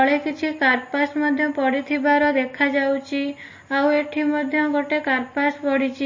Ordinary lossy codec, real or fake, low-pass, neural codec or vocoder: MP3, 32 kbps; real; 7.2 kHz; none